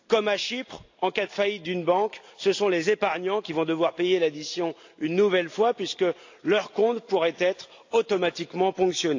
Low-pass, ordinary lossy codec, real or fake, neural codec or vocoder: 7.2 kHz; AAC, 48 kbps; real; none